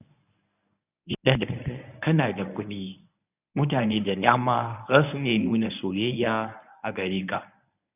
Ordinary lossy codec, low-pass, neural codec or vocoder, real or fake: none; 3.6 kHz; codec, 24 kHz, 0.9 kbps, WavTokenizer, medium speech release version 1; fake